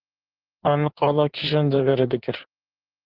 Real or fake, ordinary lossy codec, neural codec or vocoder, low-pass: fake; Opus, 16 kbps; codec, 16 kHz in and 24 kHz out, 1.1 kbps, FireRedTTS-2 codec; 5.4 kHz